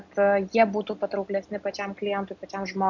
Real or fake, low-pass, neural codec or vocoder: real; 7.2 kHz; none